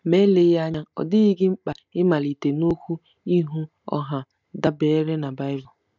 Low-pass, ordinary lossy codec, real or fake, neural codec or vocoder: 7.2 kHz; none; real; none